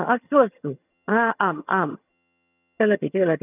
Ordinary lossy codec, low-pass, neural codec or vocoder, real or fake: none; 3.6 kHz; vocoder, 22.05 kHz, 80 mel bands, HiFi-GAN; fake